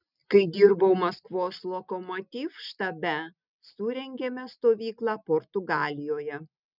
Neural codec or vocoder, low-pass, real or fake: none; 5.4 kHz; real